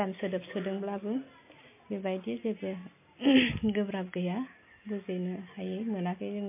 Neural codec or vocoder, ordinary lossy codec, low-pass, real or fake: none; MP3, 24 kbps; 3.6 kHz; real